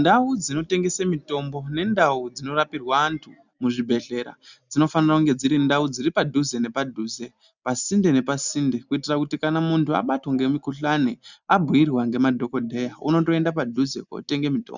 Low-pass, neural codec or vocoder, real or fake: 7.2 kHz; none; real